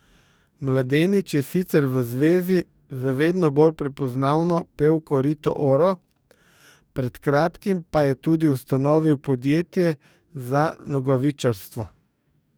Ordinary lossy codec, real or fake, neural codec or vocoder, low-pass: none; fake; codec, 44.1 kHz, 2.6 kbps, DAC; none